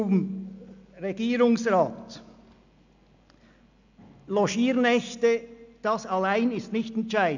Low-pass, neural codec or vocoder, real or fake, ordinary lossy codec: 7.2 kHz; none; real; none